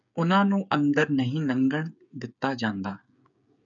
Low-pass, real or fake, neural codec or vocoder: 7.2 kHz; fake; codec, 16 kHz, 8 kbps, FreqCodec, smaller model